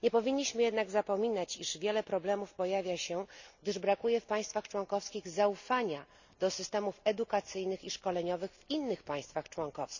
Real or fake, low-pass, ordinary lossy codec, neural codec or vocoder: real; 7.2 kHz; none; none